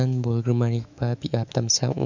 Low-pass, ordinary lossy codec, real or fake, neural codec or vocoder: 7.2 kHz; none; fake; autoencoder, 48 kHz, 128 numbers a frame, DAC-VAE, trained on Japanese speech